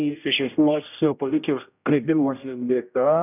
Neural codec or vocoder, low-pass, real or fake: codec, 16 kHz, 0.5 kbps, X-Codec, HuBERT features, trained on general audio; 3.6 kHz; fake